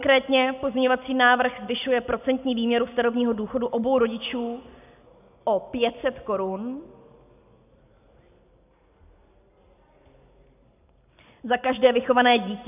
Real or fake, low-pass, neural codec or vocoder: real; 3.6 kHz; none